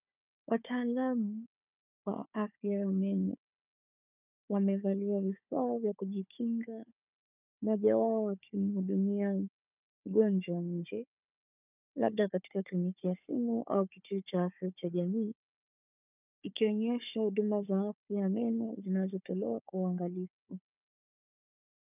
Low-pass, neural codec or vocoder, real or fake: 3.6 kHz; codec, 16 kHz, 4 kbps, FunCodec, trained on Chinese and English, 50 frames a second; fake